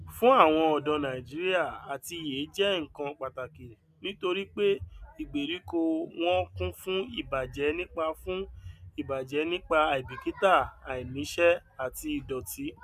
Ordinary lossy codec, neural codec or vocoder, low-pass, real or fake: none; none; 14.4 kHz; real